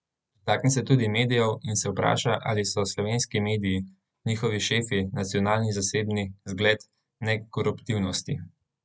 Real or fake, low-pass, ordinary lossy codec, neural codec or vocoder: real; none; none; none